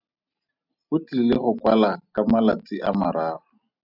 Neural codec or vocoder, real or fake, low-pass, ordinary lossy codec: none; real; 5.4 kHz; AAC, 48 kbps